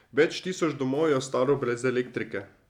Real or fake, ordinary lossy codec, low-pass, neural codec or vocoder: real; none; 19.8 kHz; none